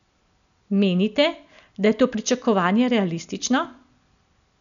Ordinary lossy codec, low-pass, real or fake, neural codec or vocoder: none; 7.2 kHz; real; none